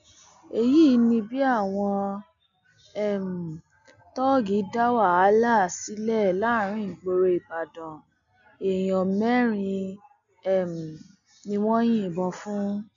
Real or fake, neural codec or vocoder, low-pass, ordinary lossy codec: real; none; 7.2 kHz; none